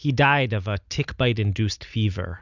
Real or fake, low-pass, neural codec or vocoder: real; 7.2 kHz; none